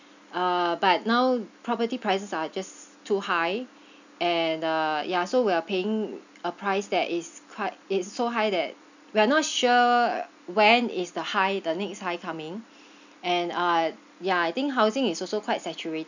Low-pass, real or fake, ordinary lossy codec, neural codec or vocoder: 7.2 kHz; real; none; none